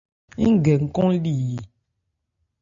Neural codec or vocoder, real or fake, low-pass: none; real; 7.2 kHz